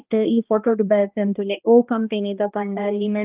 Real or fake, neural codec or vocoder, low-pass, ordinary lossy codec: fake; codec, 16 kHz, 1 kbps, X-Codec, HuBERT features, trained on balanced general audio; 3.6 kHz; Opus, 32 kbps